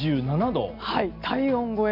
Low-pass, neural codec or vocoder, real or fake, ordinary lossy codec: 5.4 kHz; none; real; MP3, 48 kbps